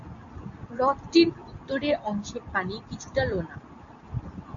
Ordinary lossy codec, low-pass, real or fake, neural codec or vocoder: AAC, 64 kbps; 7.2 kHz; real; none